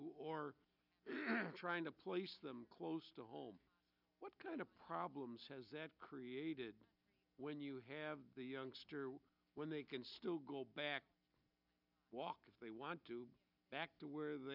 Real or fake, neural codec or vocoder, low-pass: real; none; 5.4 kHz